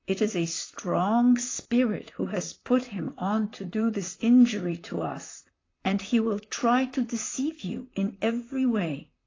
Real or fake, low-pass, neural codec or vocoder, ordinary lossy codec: fake; 7.2 kHz; vocoder, 44.1 kHz, 128 mel bands, Pupu-Vocoder; AAC, 32 kbps